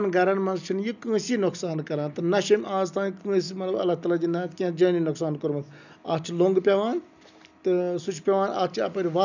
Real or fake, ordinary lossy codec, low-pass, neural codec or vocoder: real; none; 7.2 kHz; none